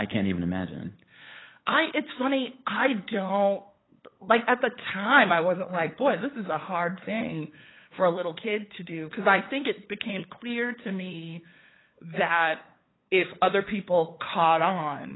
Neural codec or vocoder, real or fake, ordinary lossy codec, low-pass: codec, 16 kHz, 8 kbps, FunCodec, trained on LibriTTS, 25 frames a second; fake; AAC, 16 kbps; 7.2 kHz